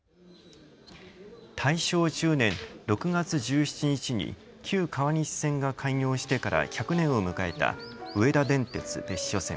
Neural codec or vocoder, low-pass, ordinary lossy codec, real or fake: none; none; none; real